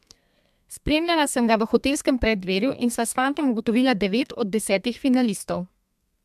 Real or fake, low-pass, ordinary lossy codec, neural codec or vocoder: fake; 14.4 kHz; MP3, 96 kbps; codec, 32 kHz, 1.9 kbps, SNAC